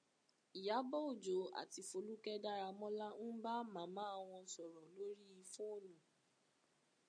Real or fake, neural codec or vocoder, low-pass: real; none; 9.9 kHz